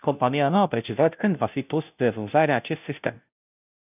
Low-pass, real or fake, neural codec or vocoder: 3.6 kHz; fake; codec, 16 kHz, 0.5 kbps, FunCodec, trained on Chinese and English, 25 frames a second